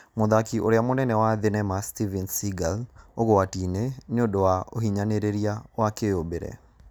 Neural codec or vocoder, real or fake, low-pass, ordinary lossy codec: none; real; none; none